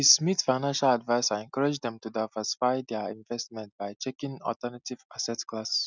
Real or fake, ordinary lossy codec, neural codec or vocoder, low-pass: real; none; none; 7.2 kHz